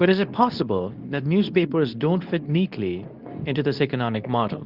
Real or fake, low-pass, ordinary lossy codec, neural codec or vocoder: fake; 5.4 kHz; Opus, 24 kbps; codec, 24 kHz, 0.9 kbps, WavTokenizer, medium speech release version 2